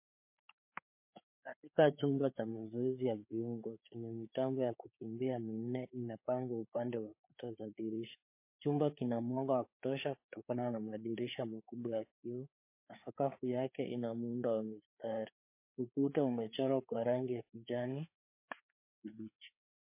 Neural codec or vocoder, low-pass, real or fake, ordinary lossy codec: codec, 16 kHz, 4 kbps, FreqCodec, larger model; 3.6 kHz; fake; MP3, 24 kbps